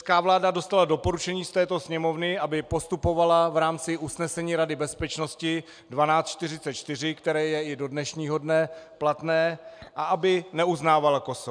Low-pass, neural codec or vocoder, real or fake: 9.9 kHz; none; real